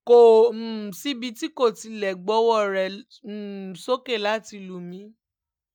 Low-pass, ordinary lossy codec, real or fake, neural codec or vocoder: 19.8 kHz; none; real; none